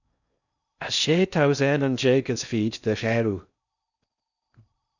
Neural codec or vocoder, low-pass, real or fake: codec, 16 kHz in and 24 kHz out, 0.6 kbps, FocalCodec, streaming, 4096 codes; 7.2 kHz; fake